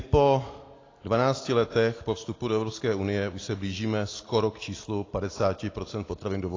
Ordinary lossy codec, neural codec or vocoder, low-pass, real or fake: AAC, 32 kbps; none; 7.2 kHz; real